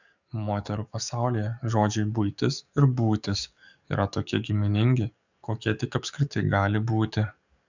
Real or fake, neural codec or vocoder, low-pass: fake; codec, 44.1 kHz, 7.8 kbps, DAC; 7.2 kHz